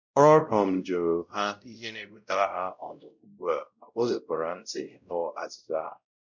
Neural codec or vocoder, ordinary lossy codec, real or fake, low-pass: codec, 16 kHz, 0.5 kbps, X-Codec, WavLM features, trained on Multilingual LibriSpeech; none; fake; 7.2 kHz